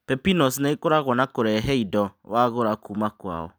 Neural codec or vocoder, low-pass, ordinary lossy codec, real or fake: none; none; none; real